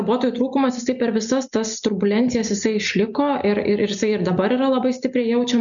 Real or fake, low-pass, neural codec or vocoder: real; 7.2 kHz; none